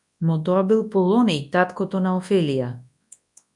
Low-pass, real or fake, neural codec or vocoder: 10.8 kHz; fake; codec, 24 kHz, 0.9 kbps, WavTokenizer, large speech release